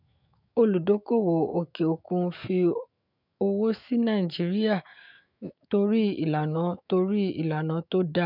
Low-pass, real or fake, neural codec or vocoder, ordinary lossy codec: 5.4 kHz; fake; autoencoder, 48 kHz, 128 numbers a frame, DAC-VAE, trained on Japanese speech; none